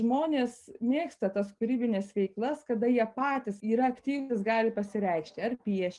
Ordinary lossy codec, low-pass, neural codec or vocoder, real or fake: Opus, 32 kbps; 10.8 kHz; none; real